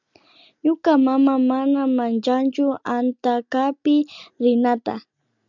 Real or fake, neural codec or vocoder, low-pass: real; none; 7.2 kHz